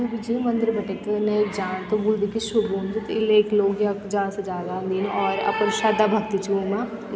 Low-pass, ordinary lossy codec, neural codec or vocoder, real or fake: none; none; none; real